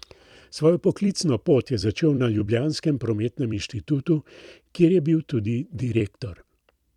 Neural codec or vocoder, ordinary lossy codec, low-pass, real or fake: vocoder, 44.1 kHz, 128 mel bands every 256 samples, BigVGAN v2; none; 19.8 kHz; fake